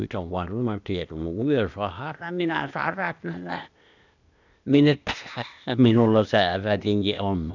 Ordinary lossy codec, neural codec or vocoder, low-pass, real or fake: none; codec, 16 kHz, 0.8 kbps, ZipCodec; 7.2 kHz; fake